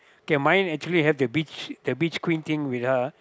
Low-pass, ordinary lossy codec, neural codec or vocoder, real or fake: none; none; none; real